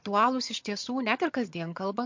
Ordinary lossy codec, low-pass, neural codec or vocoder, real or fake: MP3, 48 kbps; 7.2 kHz; vocoder, 22.05 kHz, 80 mel bands, HiFi-GAN; fake